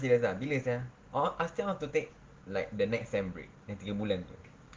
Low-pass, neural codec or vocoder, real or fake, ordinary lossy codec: 7.2 kHz; none; real; Opus, 16 kbps